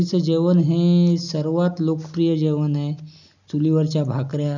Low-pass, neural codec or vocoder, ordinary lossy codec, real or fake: 7.2 kHz; none; none; real